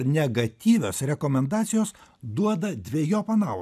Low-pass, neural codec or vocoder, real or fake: 14.4 kHz; none; real